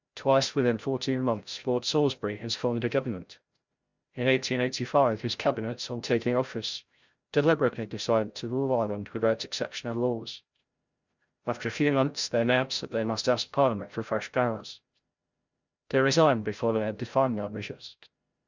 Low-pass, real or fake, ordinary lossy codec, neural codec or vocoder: 7.2 kHz; fake; Opus, 64 kbps; codec, 16 kHz, 0.5 kbps, FreqCodec, larger model